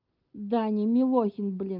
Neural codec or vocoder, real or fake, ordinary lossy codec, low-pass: none; real; Opus, 32 kbps; 5.4 kHz